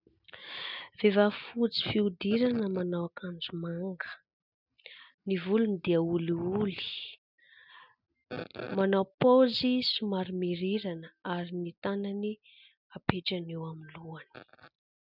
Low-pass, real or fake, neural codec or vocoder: 5.4 kHz; real; none